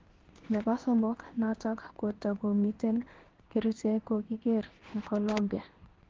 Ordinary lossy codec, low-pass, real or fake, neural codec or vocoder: Opus, 16 kbps; 7.2 kHz; fake; codec, 16 kHz in and 24 kHz out, 1 kbps, XY-Tokenizer